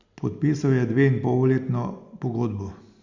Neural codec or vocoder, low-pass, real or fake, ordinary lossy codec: none; 7.2 kHz; real; Opus, 64 kbps